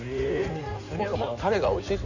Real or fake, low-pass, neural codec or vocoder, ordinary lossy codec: fake; 7.2 kHz; codec, 16 kHz in and 24 kHz out, 2.2 kbps, FireRedTTS-2 codec; none